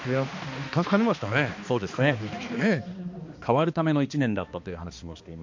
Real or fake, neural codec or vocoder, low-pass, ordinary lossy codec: fake; codec, 16 kHz, 2 kbps, X-Codec, HuBERT features, trained on balanced general audio; 7.2 kHz; MP3, 48 kbps